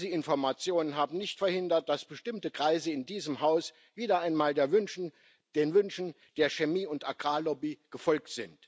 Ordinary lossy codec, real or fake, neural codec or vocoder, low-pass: none; real; none; none